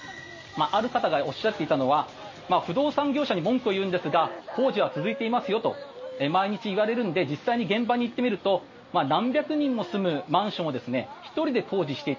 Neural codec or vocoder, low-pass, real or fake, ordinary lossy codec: none; 7.2 kHz; real; MP3, 32 kbps